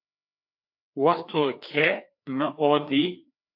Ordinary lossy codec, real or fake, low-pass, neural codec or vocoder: AAC, 48 kbps; fake; 5.4 kHz; codec, 16 kHz, 2 kbps, FreqCodec, larger model